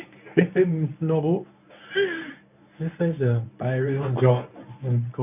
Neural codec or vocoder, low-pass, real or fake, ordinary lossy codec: codec, 24 kHz, 0.9 kbps, WavTokenizer, medium speech release version 1; 3.6 kHz; fake; none